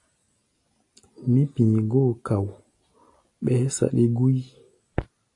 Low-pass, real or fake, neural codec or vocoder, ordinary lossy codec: 10.8 kHz; real; none; AAC, 48 kbps